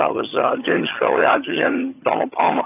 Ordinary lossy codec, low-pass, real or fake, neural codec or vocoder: AAC, 24 kbps; 3.6 kHz; fake; vocoder, 22.05 kHz, 80 mel bands, HiFi-GAN